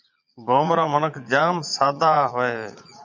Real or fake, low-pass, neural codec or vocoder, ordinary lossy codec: fake; 7.2 kHz; vocoder, 22.05 kHz, 80 mel bands, Vocos; MP3, 64 kbps